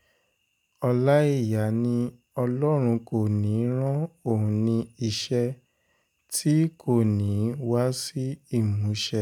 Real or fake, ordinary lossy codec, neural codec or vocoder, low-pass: real; none; none; 19.8 kHz